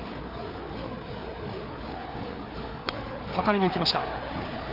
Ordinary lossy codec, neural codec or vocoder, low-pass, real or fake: none; codec, 16 kHz, 4 kbps, FreqCodec, larger model; 5.4 kHz; fake